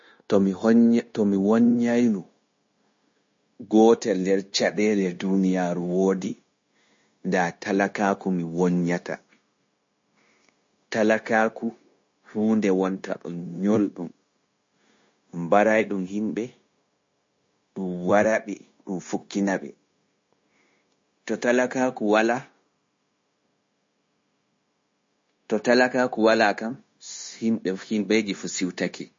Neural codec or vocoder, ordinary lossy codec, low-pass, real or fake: codec, 16 kHz, 0.9 kbps, LongCat-Audio-Codec; MP3, 32 kbps; 7.2 kHz; fake